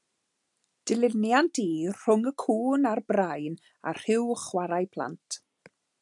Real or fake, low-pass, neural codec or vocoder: real; 10.8 kHz; none